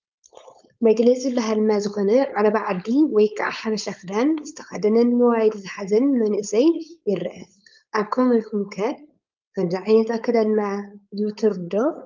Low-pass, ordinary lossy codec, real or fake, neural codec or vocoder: 7.2 kHz; Opus, 24 kbps; fake; codec, 16 kHz, 4.8 kbps, FACodec